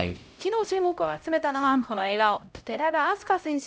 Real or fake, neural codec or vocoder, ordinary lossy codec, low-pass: fake; codec, 16 kHz, 0.5 kbps, X-Codec, HuBERT features, trained on LibriSpeech; none; none